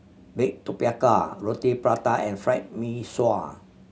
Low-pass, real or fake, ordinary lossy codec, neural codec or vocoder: none; real; none; none